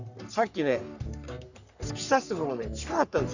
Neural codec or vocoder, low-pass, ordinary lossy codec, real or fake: codec, 44.1 kHz, 3.4 kbps, Pupu-Codec; 7.2 kHz; none; fake